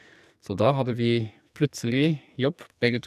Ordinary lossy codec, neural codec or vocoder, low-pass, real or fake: none; codec, 44.1 kHz, 2.6 kbps, SNAC; 14.4 kHz; fake